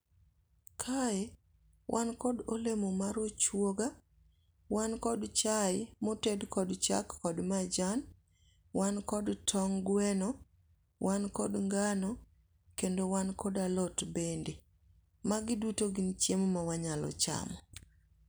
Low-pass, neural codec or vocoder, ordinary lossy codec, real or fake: none; none; none; real